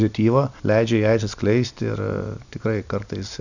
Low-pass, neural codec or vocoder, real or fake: 7.2 kHz; none; real